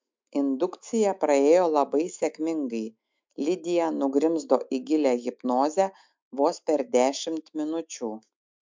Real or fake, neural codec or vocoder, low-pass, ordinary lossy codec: fake; autoencoder, 48 kHz, 128 numbers a frame, DAC-VAE, trained on Japanese speech; 7.2 kHz; MP3, 64 kbps